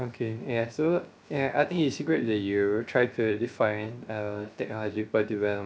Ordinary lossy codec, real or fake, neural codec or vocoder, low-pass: none; fake; codec, 16 kHz, 0.3 kbps, FocalCodec; none